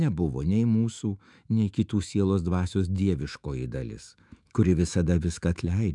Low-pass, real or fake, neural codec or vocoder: 10.8 kHz; fake; autoencoder, 48 kHz, 128 numbers a frame, DAC-VAE, trained on Japanese speech